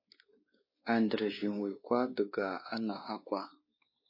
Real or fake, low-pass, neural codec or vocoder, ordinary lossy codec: fake; 5.4 kHz; codec, 16 kHz, 2 kbps, X-Codec, WavLM features, trained on Multilingual LibriSpeech; MP3, 24 kbps